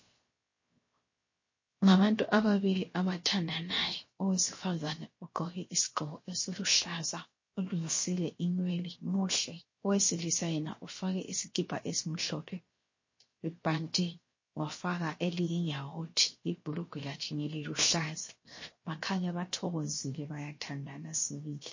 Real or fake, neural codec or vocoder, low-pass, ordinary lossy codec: fake; codec, 16 kHz, 0.7 kbps, FocalCodec; 7.2 kHz; MP3, 32 kbps